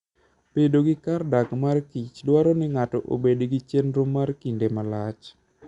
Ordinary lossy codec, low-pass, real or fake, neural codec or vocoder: Opus, 64 kbps; 10.8 kHz; real; none